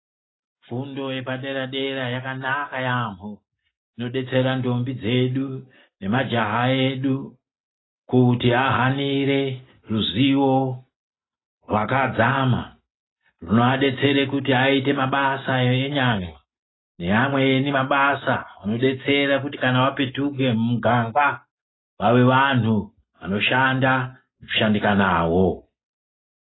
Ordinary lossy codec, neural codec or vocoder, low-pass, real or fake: AAC, 16 kbps; none; 7.2 kHz; real